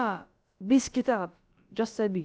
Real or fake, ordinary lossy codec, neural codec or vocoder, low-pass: fake; none; codec, 16 kHz, about 1 kbps, DyCAST, with the encoder's durations; none